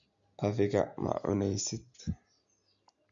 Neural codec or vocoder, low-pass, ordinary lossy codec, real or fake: none; 7.2 kHz; AAC, 64 kbps; real